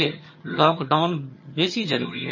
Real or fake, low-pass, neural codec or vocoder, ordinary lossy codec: fake; 7.2 kHz; vocoder, 22.05 kHz, 80 mel bands, HiFi-GAN; MP3, 32 kbps